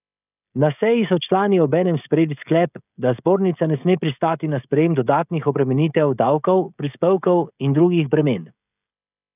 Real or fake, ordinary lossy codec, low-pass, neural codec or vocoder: fake; none; 3.6 kHz; codec, 16 kHz, 16 kbps, FreqCodec, smaller model